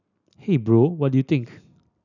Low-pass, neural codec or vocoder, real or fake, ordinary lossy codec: 7.2 kHz; none; real; none